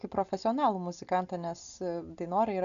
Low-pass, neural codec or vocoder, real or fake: 7.2 kHz; none; real